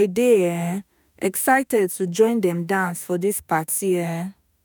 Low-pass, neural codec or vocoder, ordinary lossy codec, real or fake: none; autoencoder, 48 kHz, 32 numbers a frame, DAC-VAE, trained on Japanese speech; none; fake